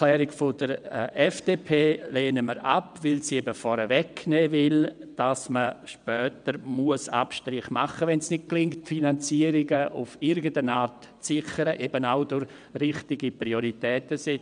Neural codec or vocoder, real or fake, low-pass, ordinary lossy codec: vocoder, 22.05 kHz, 80 mel bands, WaveNeXt; fake; 9.9 kHz; none